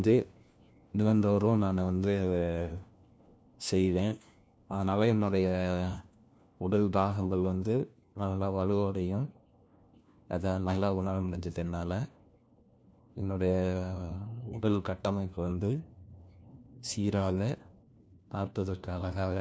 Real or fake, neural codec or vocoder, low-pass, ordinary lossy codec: fake; codec, 16 kHz, 1 kbps, FunCodec, trained on LibriTTS, 50 frames a second; none; none